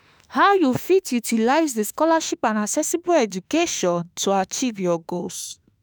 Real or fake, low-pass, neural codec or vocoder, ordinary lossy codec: fake; none; autoencoder, 48 kHz, 32 numbers a frame, DAC-VAE, trained on Japanese speech; none